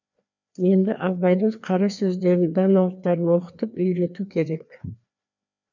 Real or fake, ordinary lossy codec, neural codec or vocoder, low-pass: fake; none; codec, 16 kHz, 2 kbps, FreqCodec, larger model; 7.2 kHz